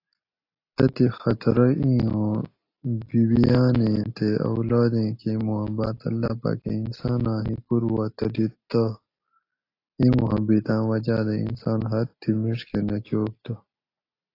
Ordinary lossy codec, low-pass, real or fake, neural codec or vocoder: AAC, 32 kbps; 5.4 kHz; real; none